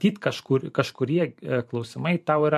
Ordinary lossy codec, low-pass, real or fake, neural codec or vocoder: MP3, 64 kbps; 14.4 kHz; fake; vocoder, 44.1 kHz, 128 mel bands every 512 samples, BigVGAN v2